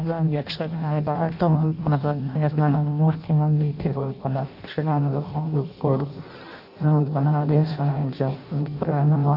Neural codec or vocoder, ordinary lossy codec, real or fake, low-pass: codec, 16 kHz in and 24 kHz out, 0.6 kbps, FireRedTTS-2 codec; none; fake; 5.4 kHz